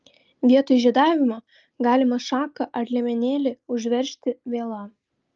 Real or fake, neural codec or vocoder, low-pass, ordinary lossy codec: real; none; 7.2 kHz; Opus, 32 kbps